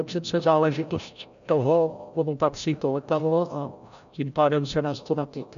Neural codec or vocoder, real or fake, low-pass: codec, 16 kHz, 0.5 kbps, FreqCodec, larger model; fake; 7.2 kHz